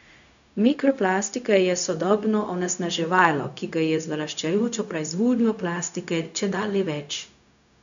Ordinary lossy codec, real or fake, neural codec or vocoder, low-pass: none; fake; codec, 16 kHz, 0.4 kbps, LongCat-Audio-Codec; 7.2 kHz